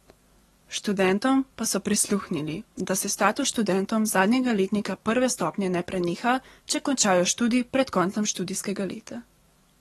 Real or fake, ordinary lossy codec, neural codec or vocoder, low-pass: fake; AAC, 32 kbps; codec, 44.1 kHz, 7.8 kbps, DAC; 19.8 kHz